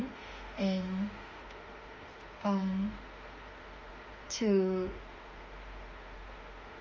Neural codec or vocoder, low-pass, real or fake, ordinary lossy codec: autoencoder, 48 kHz, 32 numbers a frame, DAC-VAE, trained on Japanese speech; 7.2 kHz; fake; Opus, 32 kbps